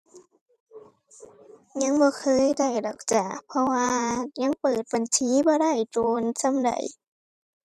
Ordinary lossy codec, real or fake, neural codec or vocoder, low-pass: none; fake; vocoder, 44.1 kHz, 128 mel bands every 512 samples, BigVGAN v2; 14.4 kHz